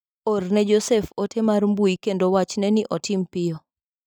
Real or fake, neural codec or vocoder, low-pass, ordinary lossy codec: real; none; 19.8 kHz; none